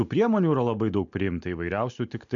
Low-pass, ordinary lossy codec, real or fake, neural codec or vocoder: 7.2 kHz; MP3, 64 kbps; real; none